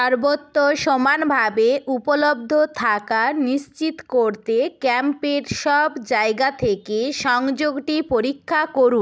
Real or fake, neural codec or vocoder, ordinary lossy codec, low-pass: real; none; none; none